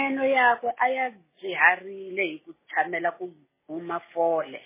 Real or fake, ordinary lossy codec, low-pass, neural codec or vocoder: real; MP3, 16 kbps; 3.6 kHz; none